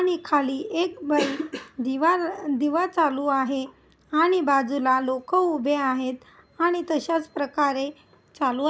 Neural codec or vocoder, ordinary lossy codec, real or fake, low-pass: none; none; real; none